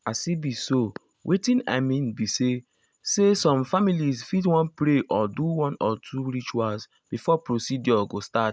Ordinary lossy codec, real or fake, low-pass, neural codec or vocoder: none; real; none; none